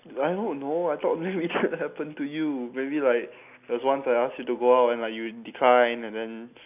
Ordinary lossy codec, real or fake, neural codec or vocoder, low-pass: none; real; none; 3.6 kHz